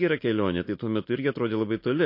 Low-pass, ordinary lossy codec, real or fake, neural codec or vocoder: 5.4 kHz; MP3, 32 kbps; real; none